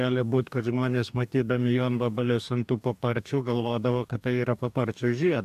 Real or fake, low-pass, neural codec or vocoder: fake; 14.4 kHz; codec, 44.1 kHz, 2.6 kbps, DAC